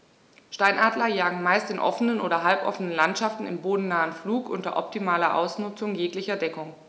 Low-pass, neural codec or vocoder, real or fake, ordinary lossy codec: none; none; real; none